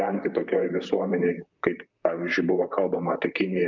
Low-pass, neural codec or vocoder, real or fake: 7.2 kHz; vocoder, 44.1 kHz, 128 mel bands, Pupu-Vocoder; fake